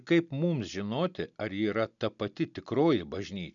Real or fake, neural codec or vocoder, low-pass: real; none; 7.2 kHz